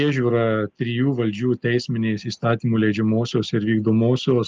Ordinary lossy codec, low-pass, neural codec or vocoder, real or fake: Opus, 24 kbps; 7.2 kHz; none; real